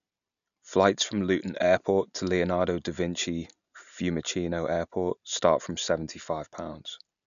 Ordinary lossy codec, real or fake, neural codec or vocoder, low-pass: none; real; none; 7.2 kHz